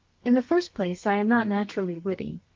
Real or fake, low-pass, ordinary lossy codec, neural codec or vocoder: fake; 7.2 kHz; Opus, 24 kbps; codec, 32 kHz, 1.9 kbps, SNAC